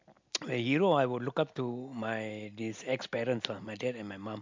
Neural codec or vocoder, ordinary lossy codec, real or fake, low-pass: none; MP3, 64 kbps; real; 7.2 kHz